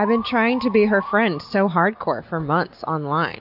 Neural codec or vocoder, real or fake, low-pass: none; real; 5.4 kHz